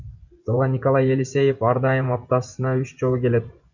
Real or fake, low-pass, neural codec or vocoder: fake; 7.2 kHz; vocoder, 24 kHz, 100 mel bands, Vocos